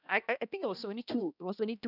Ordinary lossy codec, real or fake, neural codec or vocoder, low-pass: none; fake; codec, 16 kHz, 1 kbps, X-Codec, HuBERT features, trained on balanced general audio; 5.4 kHz